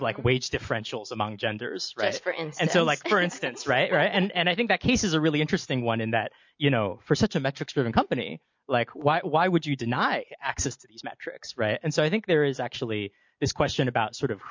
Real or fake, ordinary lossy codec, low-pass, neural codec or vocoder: real; MP3, 48 kbps; 7.2 kHz; none